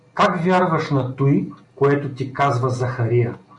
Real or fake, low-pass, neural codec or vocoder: real; 10.8 kHz; none